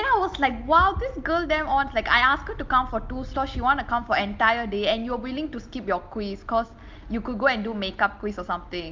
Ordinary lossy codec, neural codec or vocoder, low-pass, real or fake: Opus, 24 kbps; none; 7.2 kHz; real